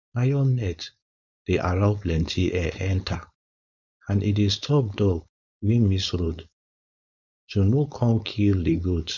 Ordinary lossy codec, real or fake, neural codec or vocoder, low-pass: none; fake; codec, 16 kHz, 4.8 kbps, FACodec; 7.2 kHz